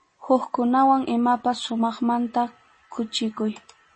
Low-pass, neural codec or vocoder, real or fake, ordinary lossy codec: 10.8 kHz; none; real; MP3, 32 kbps